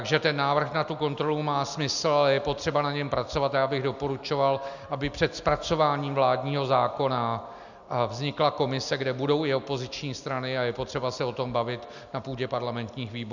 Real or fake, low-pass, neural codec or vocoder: real; 7.2 kHz; none